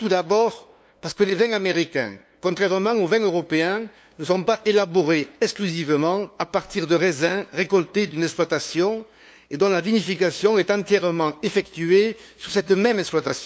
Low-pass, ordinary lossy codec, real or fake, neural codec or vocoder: none; none; fake; codec, 16 kHz, 2 kbps, FunCodec, trained on LibriTTS, 25 frames a second